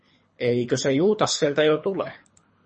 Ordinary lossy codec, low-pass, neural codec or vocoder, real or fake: MP3, 32 kbps; 10.8 kHz; codec, 24 kHz, 3 kbps, HILCodec; fake